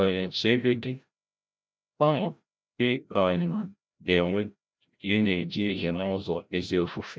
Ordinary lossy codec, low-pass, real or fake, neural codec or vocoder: none; none; fake; codec, 16 kHz, 0.5 kbps, FreqCodec, larger model